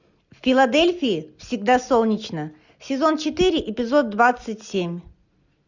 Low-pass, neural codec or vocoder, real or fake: 7.2 kHz; none; real